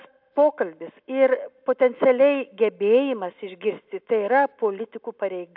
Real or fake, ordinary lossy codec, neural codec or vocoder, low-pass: real; AAC, 48 kbps; none; 5.4 kHz